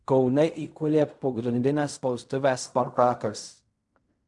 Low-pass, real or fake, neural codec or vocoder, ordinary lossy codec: 10.8 kHz; fake; codec, 16 kHz in and 24 kHz out, 0.4 kbps, LongCat-Audio-Codec, fine tuned four codebook decoder; MP3, 96 kbps